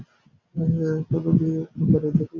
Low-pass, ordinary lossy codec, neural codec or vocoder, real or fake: 7.2 kHz; MP3, 48 kbps; none; real